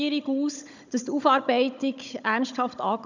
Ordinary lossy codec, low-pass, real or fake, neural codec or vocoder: none; 7.2 kHz; fake; codec, 16 kHz, 16 kbps, FunCodec, trained on Chinese and English, 50 frames a second